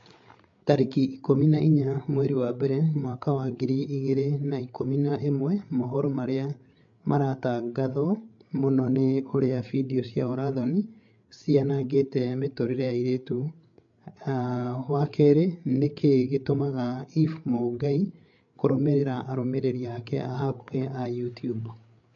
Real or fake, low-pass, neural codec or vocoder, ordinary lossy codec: fake; 7.2 kHz; codec, 16 kHz, 8 kbps, FreqCodec, larger model; MP3, 48 kbps